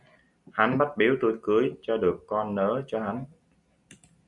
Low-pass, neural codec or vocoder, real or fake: 10.8 kHz; none; real